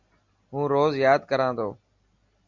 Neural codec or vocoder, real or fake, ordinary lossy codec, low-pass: none; real; Opus, 64 kbps; 7.2 kHz